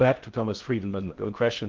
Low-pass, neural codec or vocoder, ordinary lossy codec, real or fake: 7.2 kHz; codec, 16 kHz in and 24 kHz out, 0.6 kbps, FocalCodec, streaming, 4096 codes; Opus, 16 kbps; fake